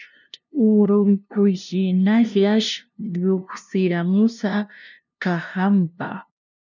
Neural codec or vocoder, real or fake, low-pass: codec, 16 kHz, 0.5 kbps, FunCodec, trained on LibriTTS, 25 frames a second; fake; 7.2 kHz